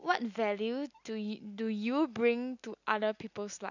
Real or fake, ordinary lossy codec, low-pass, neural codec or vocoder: real; none; 7.2 kHz; none